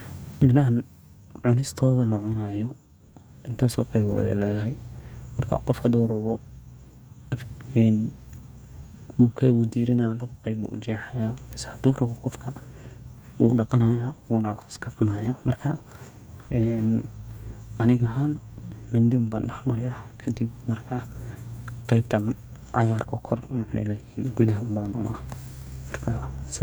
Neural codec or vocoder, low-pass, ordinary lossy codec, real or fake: codec, 44.1 kHz, 2.6 kbps, DAC; none; none; fake